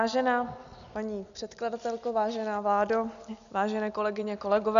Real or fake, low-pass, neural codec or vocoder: real; 7.2 kHz; none